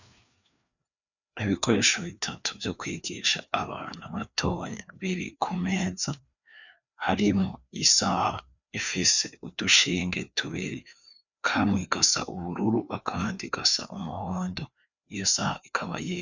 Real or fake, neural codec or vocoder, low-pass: fake; codec, 16 kHz, 2 kbps, FreqCodec, larger model; 7.2 kHz